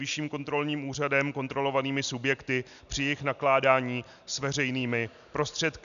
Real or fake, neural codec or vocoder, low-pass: real; none; 7.2 kHz